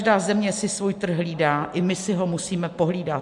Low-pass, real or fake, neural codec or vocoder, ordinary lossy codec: 10.8 kHz; real; none; MP3, 64 kbps